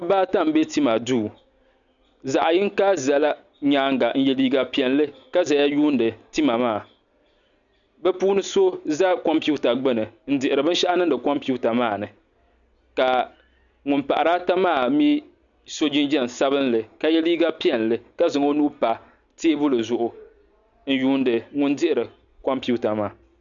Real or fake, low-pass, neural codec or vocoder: real; 7.2 kHz; none